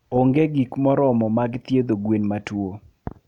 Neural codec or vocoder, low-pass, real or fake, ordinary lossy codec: none; 19.8 kHz; real; none